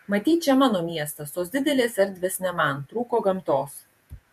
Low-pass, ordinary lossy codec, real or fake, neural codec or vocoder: 14.4 kHz; MP3, 96 kbps; fake; vocoder, 48 kHz, 128 mel bands, Vocos